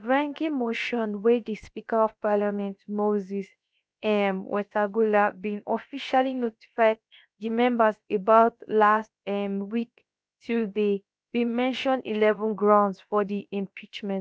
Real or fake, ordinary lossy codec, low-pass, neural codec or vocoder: fake; none; none; codec, 16 kHz, about 1 kbps, DyCAST, with the encoder's durations